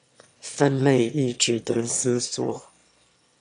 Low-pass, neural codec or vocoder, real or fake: 9.9 kHz; autoencoder, 22.05 kHz, a latent of 192 numbers a frame, VITS, trained on one speaker; fake